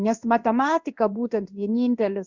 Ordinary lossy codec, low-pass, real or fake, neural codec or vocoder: Opus, 64 kbps; 7.2 kHz; fake; codec, 16 kHz in and 24 kHz out, 1 kbps, XY-Tokenizer